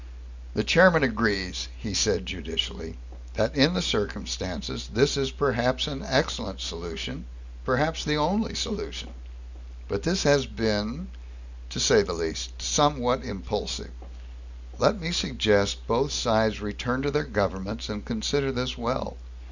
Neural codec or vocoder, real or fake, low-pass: none; real; 7.2 kHz